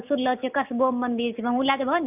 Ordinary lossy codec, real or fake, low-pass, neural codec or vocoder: none; real; 3.6 kHz; none